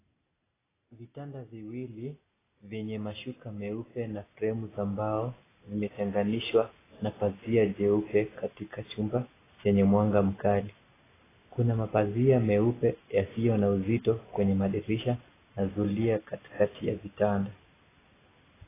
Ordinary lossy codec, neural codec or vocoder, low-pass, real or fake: AAC, 16 kbps; none; 3.6 kHz; real